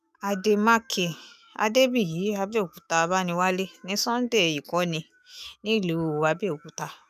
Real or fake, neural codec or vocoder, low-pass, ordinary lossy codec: fake; autoencoder, 48 kHz, 128 numbers a frame, DAC-VAE, trained on Japanese speech; 14.4 kHz; none